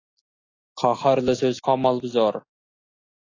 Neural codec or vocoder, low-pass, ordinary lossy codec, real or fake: none; 7.2 kHz; AAC, 32 kbps; real